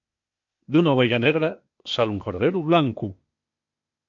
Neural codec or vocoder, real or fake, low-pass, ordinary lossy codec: codec, 16 kHz, 0.8 kbps, ZipCodec; fake; 7.2 kHz; MP3, 48 kbps